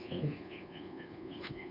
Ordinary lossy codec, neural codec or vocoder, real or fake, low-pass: MP3, 48 kbps; codec, 24 kHz, 1.2 kbps, DualCodec; fake; 5.4 kHz